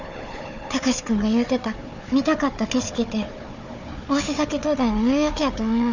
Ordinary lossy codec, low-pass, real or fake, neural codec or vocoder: none; 7.2 kHz; fake; codec, 16 kHz, 4 kbps, FunCodec, trained on Chinese and English, 50 frames a second